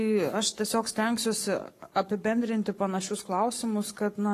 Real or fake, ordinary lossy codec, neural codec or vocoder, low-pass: fake; AAC, 48 kbps; codec, 44.1 kHz, 7.8 kbps, Pupu-Codec; 14.4 kHz